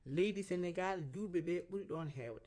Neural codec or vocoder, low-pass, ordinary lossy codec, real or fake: codec, 16 kHz in and 24 kHz out, 2.2 kbps, FireRedTTS-2 codec; 9.9 kHz; MP3, 64 kbps; fake